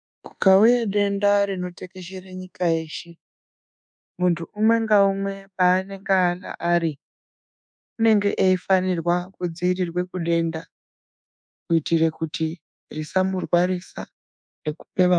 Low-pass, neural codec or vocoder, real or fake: 9.9 kHz; codec, 24 kHz, 1.2 kbps, DualCodec; fake